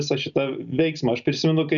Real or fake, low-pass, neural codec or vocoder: real; 7.2 kHz; none